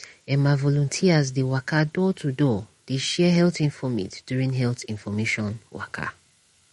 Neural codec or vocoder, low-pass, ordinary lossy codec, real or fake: autoencoder, 48 kHz, 128 numbers a frame, DAC-VAE, trained on Japanese speech; 19.8 kHz; MP3, 48 kbps; fake